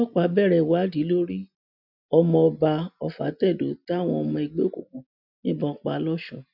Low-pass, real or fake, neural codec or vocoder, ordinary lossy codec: 5.4 kHz; real; none; none